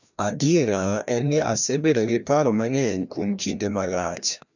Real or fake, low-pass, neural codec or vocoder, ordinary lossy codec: fake; 7.2 kHz; codec, 16 kHz, 1 kbps, FreqCodec, larger model; none